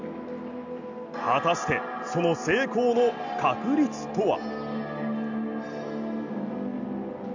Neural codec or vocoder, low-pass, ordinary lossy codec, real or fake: none; 7.2 kHz; none; real